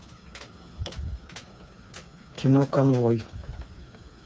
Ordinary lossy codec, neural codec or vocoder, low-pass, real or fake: none; codec, 16 kHz, 4 kbps, FreqCodec, smaller model; none; fake